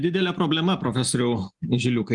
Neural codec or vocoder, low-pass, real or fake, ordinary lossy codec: none; 10.8 kHz; real; Opus, 32 kbps